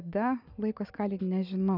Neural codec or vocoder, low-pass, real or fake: none; 5.4 kHz; real